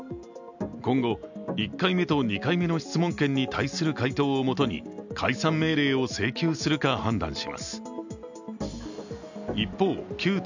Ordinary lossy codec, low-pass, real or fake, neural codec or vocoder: none; 7.2 kHz; real; none